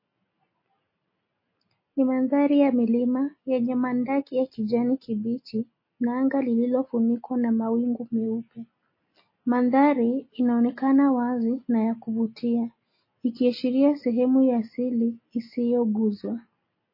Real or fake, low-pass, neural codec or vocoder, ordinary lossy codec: real; 5.4 kHz; none; MP3, 24 kbps